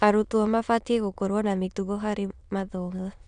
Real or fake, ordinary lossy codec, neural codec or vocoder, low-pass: fake; none; autoencoder, 22.05 kHz, a latent of 192 numbers a frame, VITS, trained on many speakers; 9.9 kHz